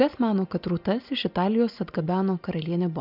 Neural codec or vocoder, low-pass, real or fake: none; 5.4 kHz; real